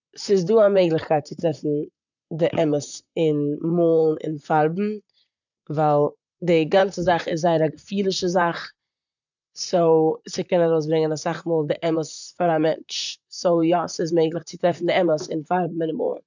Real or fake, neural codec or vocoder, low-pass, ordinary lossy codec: fake; vocoder, 44.1 kHz, 128 mel bands, Pupu-Vocoder; 7.2 kHz; none